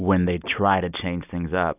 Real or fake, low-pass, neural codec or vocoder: real; 3.6 kHz; none